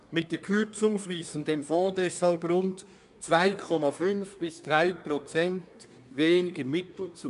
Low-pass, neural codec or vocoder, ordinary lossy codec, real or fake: 10.8 kHz; codec, 24 kHz, 1 kbps, SNAC; none; fake